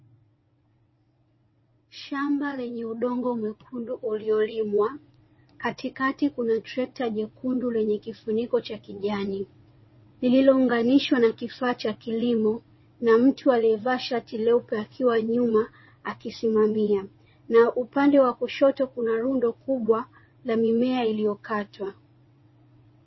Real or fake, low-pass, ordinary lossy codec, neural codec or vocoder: fake; 7.2 kHz; MP3, 24 kbps; vocoder, 22.05 kHz, 80 mel bands, WaveNeXt